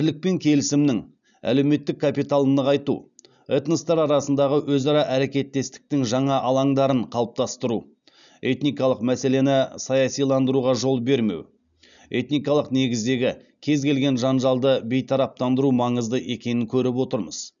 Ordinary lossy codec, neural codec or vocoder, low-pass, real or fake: none; none; 7.2 kHz; real